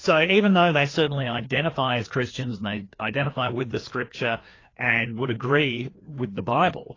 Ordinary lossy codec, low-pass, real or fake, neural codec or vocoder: AAC, 32 kbps; 7.2 kHz; fake; codec, 16 kHz, 2 kbps, FreqCodec, larger model